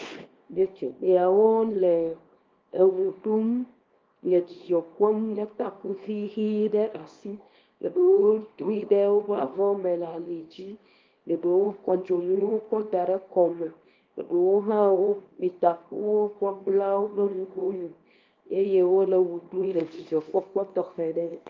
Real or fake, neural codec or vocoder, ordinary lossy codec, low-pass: fake; codec, 24 kHz, 0.9 kbps, WavTokenizer, small release; Opus, 32 kbps; 7.2 kHz